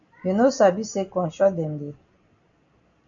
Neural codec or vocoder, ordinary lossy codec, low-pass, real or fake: none; AAC, 64 kbps; 7.2 kHz; real